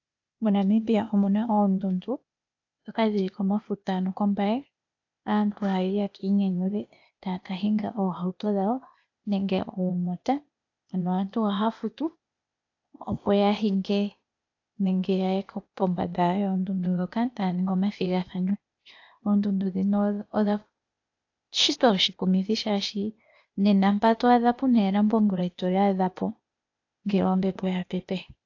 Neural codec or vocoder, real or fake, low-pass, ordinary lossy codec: codec, 16 kHz, 0.8 kbps, ZipCodec; fake; 7.2 kHz; AAC, 48 kbps